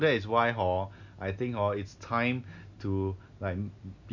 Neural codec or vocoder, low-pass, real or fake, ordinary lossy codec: none; 7.2 kHz; real; none